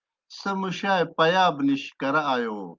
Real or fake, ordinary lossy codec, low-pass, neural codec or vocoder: real; Opus, 32 kbps; 7.2 kHz; none